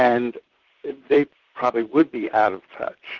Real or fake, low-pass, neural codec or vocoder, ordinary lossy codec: fake; 7.2 kHz; vocoder, 44.1 kHz, 128 mel bands, Pupu-Vocoder; Opus, 16 kbps